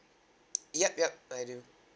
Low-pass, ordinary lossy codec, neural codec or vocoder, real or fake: none; none; none; real